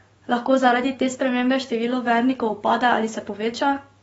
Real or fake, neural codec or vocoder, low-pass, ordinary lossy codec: fake; codec, 44.1 kHz, 7.8 kbps, DAC; 19.8 kHz; AAC, 24 kbps